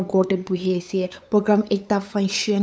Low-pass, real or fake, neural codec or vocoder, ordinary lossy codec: none; fake; codec, 16 kHz, 8 kbps, FunCodec, trained on LibriTTS, 25 frames a second; none